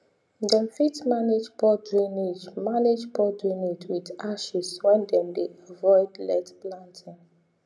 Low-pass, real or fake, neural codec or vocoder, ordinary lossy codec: none; real; none; none